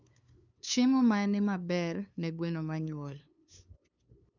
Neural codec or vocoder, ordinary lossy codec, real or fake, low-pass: codec, 16 kHz, 2 kbps, FunCodec, trained on LibriTTS, 25 frames a second; none; fake; 7.2 kHz